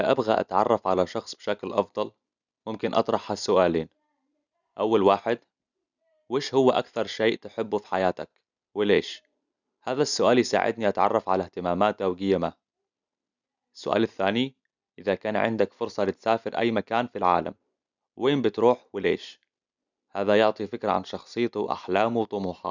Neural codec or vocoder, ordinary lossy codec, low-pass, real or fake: none; none; 7.2 kHz; real